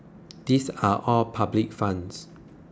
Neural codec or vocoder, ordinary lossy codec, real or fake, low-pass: none; none; real; none